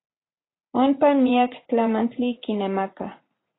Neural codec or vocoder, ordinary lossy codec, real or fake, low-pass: vocoder, 44.1 kHz, 80 mel bands, Vocos; AAC, 16 kbps; fake; 7.2 kHz